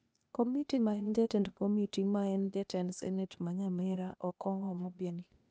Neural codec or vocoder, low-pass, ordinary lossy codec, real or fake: codec, 16 kHz, 0.8 kbps, ZipCodec; none; none; fake